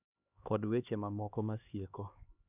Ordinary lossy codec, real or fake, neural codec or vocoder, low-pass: none; fake; codec, 16 kHz, 2 kbps, X-Codec, HuBERT features, trained on LibriSpeech; 3.6 kHz